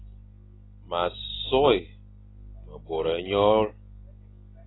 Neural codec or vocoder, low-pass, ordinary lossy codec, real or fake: none; 7.2 kHz; AAC, 16 kbps; real